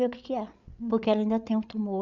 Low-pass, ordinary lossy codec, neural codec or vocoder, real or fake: 7.2 kHz; none; codec, 16 kHz, 8 kbps, FreqCodec, larger model; fake